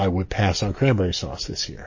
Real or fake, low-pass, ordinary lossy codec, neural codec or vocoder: fake; 7.2 kHz; MP3, 32 kbps; codec, 44.1 kHz, 7.8 kbps, DAC